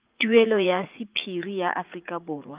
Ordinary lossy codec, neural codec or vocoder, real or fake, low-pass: Opus, 24 kbps; vocoder, 44.1 kHz, 80 mel bands, Vocos; fake; 3.6 kHz